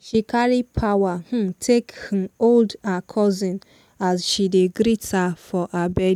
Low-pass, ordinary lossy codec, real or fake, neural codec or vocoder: 19.8 kHz; none; real; none